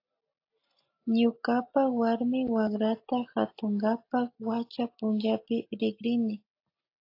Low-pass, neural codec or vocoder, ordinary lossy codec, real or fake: 5.4 kHz; none; AAC, 32 kbps; real